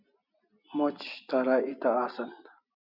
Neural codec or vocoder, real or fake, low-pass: none; real; 5.4 kHz